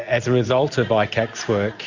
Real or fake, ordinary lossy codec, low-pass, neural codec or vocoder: real; Opus, 64 kbps; 7.2 kHz; none